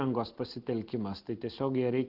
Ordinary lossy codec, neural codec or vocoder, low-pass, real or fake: Opus, 16 kbps; none; 5.4 kHz; real